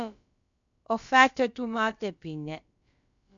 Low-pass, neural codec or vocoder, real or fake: 7.2 kHz; codec, 16 kHz, about 1 kbps, DyCAST, with the encoder's durations; fake